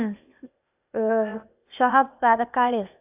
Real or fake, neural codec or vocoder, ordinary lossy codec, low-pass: fake; codec, 16 kHz, 0.8 kbps, ZipCodec; AAC, 32 kbps; 3.6 kHz